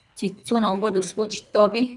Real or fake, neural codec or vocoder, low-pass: fake; codec, 24 kHz, 1.5 kbps, HILCodec; 10.8 kHz